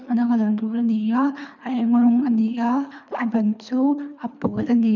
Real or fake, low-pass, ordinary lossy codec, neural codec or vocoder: fake; 7.2 kHz; none; codec, 24 kHz, 3 kbps, HILCodec